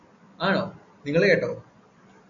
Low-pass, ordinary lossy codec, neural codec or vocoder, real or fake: 7.2 kHz; AAC, 64 kbps; none; real